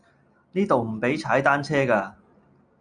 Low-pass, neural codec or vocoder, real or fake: 9.9 kHz; none; real